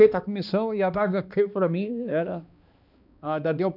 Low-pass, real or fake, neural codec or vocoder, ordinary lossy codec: 5.4 kHz; fake; codec, 16 kHz, 2 kbps, X-Codec, HuBERT features, trained on balanced general audio; none